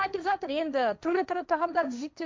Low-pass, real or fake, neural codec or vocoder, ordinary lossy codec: none; fake; codec, 16 kHz, 1.1 kbps, Voila-Tokenizer; none